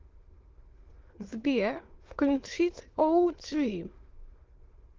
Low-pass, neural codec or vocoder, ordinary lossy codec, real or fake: 7.2 kHz; autoencoder, 22.05 kHz, a latent of 192 numbers a frame, VITS, trained on many speakers; Opus, 16 kbps; fake